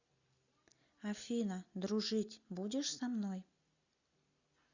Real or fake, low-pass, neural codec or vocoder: real; 7.2 kHz; none